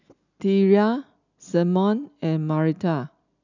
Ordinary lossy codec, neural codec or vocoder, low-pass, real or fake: none; none; 7.2 kHz; real